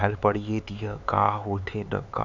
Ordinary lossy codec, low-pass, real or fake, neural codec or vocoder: none; 7.2 kHz; fake; codec, 16 kHz, 4 kbps, X-Codec, HuBERT features, trained on LibriSpeech